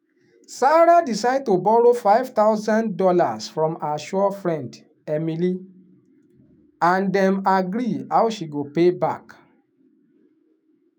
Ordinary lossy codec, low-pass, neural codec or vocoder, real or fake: none; none; autoencoder, 48 kHz, 128 numbers a frame, DAC-VAE, trained on Japanese speech; fake